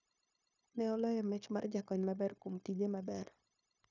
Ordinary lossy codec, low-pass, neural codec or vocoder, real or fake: none; 7.2 kHz; codec, 16 kHz, 0.9 kbps, LongCat-Audio-Codec; fake